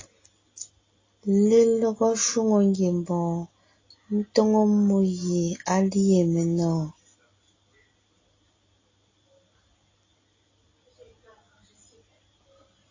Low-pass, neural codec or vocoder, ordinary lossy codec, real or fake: 7.2 kHz; none; AAC, 32 kbps; real